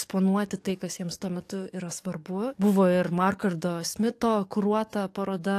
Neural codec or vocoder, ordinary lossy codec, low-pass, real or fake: codec, 44.1 kHz, 7.8 kbps, DAC; AAC, 64 kbps; 14.4 kHz; fake